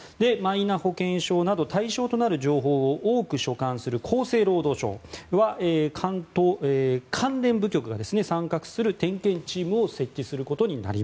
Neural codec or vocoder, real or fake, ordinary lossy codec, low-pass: none; real; none; none